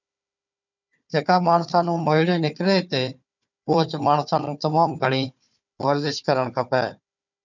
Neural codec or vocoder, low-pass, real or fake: codec, 16 kHz, 4 kbps, FunCodec, trained on Chinese and English, 50 frames a second; 7.2 kHz; fake